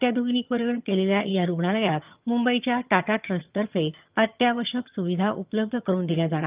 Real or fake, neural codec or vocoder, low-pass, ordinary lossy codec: fake; vocoder, 22.05 kHz, 80 mel bands, HiFi-GAN; 3.6 kHz; Opus, 24 kbps